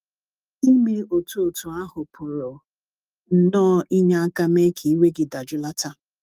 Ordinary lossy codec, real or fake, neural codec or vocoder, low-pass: Opus, 32 kbps; fake; autoencoder, 48 kHz, 128 numbers a frame, DAC-VAE, trained on Japanese speech; 14.4 kHz